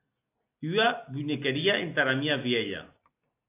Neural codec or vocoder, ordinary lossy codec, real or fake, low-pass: none; AAC, 24 kbps; real; 3.6 kHz